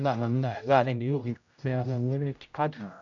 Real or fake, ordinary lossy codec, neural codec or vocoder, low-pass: fake; none; codec, 16 kHz, 0.5 kbps, X-Codec, HuBERT features, trained on general audio; 7.2 kHz